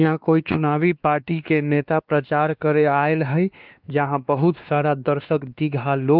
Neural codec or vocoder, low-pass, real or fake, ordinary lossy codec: codec, 16 kHz, 2 kbps, X-Codec, WavLM features, trained on Multilingual LibriSpeech; 5.4 kHz; fake; Opus, 24 kbps